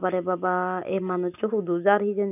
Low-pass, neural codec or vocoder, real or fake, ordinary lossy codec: 3.6 kHz; none; real; none